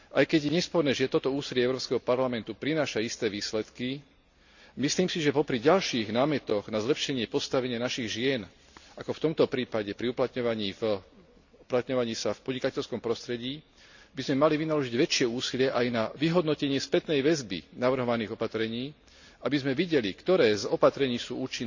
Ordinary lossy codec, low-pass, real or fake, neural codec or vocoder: none; 7.2 kHz; real; none